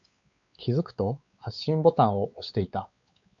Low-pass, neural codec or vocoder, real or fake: 7.2 kHz; codec, 16 kHz, 4 kbps, X-Codec, WavLM features, trained on Multilingual LibriSpeech; fake